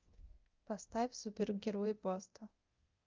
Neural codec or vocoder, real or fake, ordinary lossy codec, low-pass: codec, 24 kHz, 0.9 kbps, DualCodec; fake; Opus, 24 kbps; 7.2 kHz